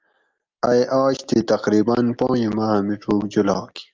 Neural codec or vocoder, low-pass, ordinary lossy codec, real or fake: none; 7.2 kHz; Opus, 24 kbps; real